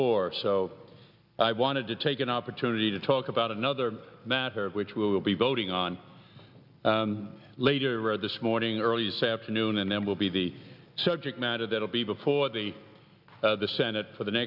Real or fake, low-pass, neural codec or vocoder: real; 5.4 kHz; none